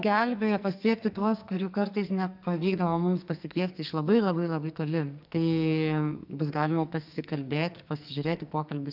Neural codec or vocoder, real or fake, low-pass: codec, 44.1 kHz, 2.6 kbps, SNAC; fake; 5.4 kHz